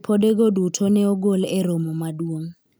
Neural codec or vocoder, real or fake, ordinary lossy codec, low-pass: none; real; none; none